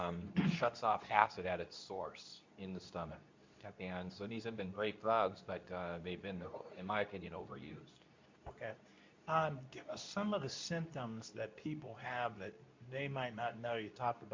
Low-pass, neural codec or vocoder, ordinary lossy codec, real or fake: 7.2 kHz; codec, 24 kHz, 0.9 kbps, WavTokenizer, medium speech release version 2; MP3, 64 kbps; fake